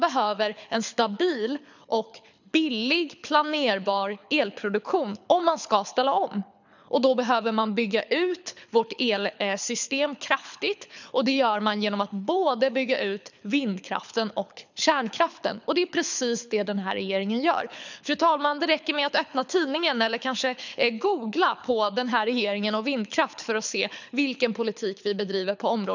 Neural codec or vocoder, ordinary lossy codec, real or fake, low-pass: codec, 24 kHz, 6 kbps, HILCodec; none; fake; 7.2 kHz